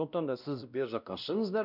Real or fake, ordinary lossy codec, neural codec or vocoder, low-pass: fake; none; codec, 16 kHz, 1 kbps, X-Codec, WavLM features, trained on Multilingual LibriSpeech; 5.4 kHz